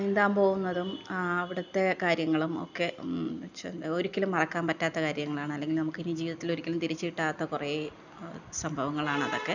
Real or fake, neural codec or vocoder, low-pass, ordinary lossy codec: real; none; 7.2 kHz; none